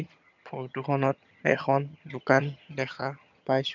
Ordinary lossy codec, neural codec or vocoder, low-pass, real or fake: none; vocoder, 22.05 kHz, 80 mel bands, HiFi-GAN; 7.2 kHz; fake